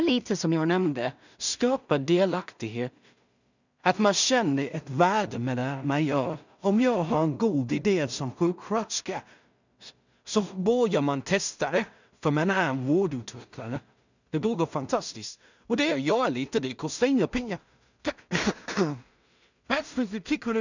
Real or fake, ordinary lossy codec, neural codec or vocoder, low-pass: fake; none; codec, 16 kHz in and 24 kHz out, 0.4 kbps, LongCat-Audio-Codec, two codebook decoder; 7.2 kHz